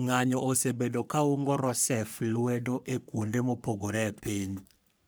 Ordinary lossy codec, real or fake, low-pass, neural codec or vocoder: none; fake; none; codec, 44.1 kHz, 3.4 kbps, Pupu-Codec